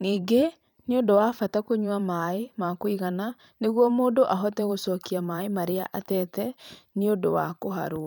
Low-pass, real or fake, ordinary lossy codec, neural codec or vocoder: none; fake; none; vocoder, 44.1 kHz, 128 mel bands every 512 samples, BigVGAN v2